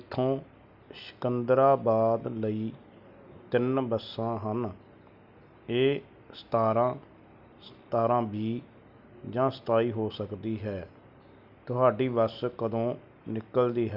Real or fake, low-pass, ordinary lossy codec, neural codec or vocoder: real; 5.4 kHz; none; none